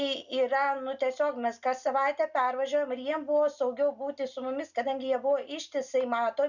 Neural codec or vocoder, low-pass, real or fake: none; 7.2 kHz; real